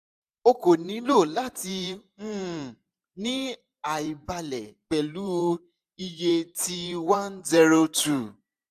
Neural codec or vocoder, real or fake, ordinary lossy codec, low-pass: vocoder, 44.1 kHz, 128 mel bands every 512 samples, BigVGAN v2; fake; AAC, 96 kbps; 14.4 kHz